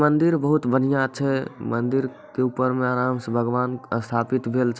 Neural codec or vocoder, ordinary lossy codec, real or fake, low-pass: none; none; real; none